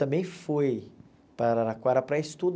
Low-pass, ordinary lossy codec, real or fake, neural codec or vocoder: none; none; real; none